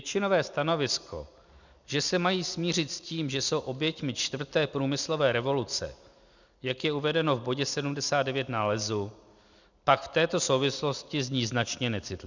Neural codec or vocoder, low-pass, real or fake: none; 7.2 kHz; real